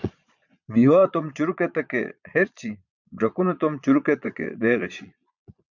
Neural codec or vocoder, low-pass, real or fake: vocoder, 22.05 kHz, 80 mel bands, Vocos; 7.2 kHz; fake